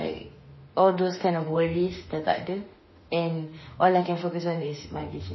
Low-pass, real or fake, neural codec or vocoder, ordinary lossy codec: 7.2 kHz; fake; autoencoder, 48 kHz, 32 numbers a frame, DAC-VAE, trained on Japanese speech; MP3, 24 kbps